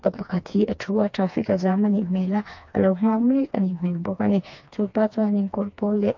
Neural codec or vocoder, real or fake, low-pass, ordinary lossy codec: codec, 16 kHz, 2 kbps, FreqCodec, smaller model; fake; 7.2 kHz; AAC, 48 kbps